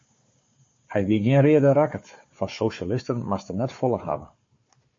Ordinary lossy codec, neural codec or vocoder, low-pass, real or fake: MP3, 32 kbps; codec, 16 kHz, 4 kbps, FunCodec, trained on Chinese and English, 50 frames a second; 7.2 kHz; fake